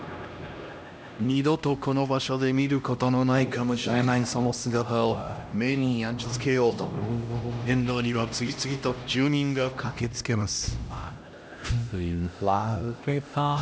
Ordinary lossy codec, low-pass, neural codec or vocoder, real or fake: none; none; codec, 16 kHz, 1 kbps, X-Codec, HuBERT features, trained on LibriSpeech; fake